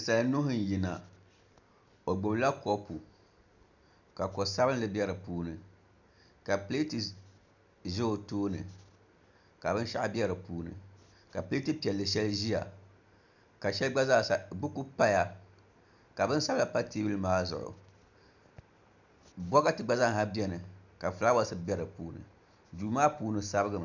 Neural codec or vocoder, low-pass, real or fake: none; 7.2 kHz; real